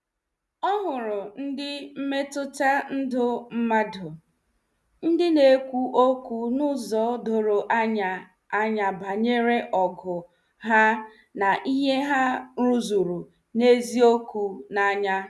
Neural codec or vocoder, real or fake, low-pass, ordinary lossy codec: none; real; none; none